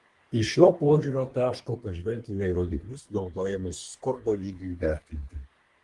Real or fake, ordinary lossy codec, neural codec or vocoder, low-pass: fake; Opus, 24 kbps; codec, 24 kHz, 1 kbps, SNAC; 10.8 kHz